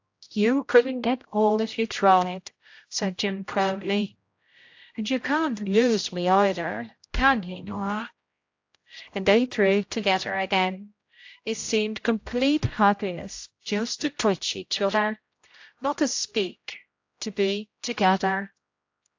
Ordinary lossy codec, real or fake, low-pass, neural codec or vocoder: AAC, 48 kbps; fake; 7.2 kHz; codec, 16 kHz, 0.5 kbps, X-Codec, HuBERT features, trained on general audio